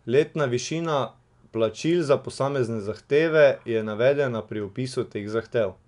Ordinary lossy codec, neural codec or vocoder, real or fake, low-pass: MP3, 96 kbps; none; real; 10.8 kHz